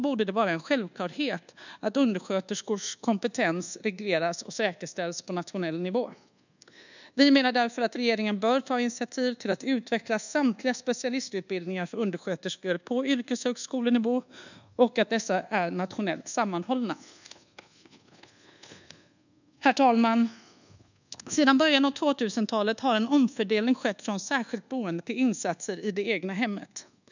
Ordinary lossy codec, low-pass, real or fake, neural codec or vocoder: none; 7.2 kHz; fake; codec, 24 kHz, 1.2 kbps, DualCodec